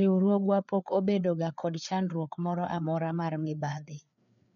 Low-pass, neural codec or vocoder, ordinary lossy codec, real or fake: 7.2 kHz; codec, 16 kHz, 4 kbps, FreqCodec, larger model; none; fake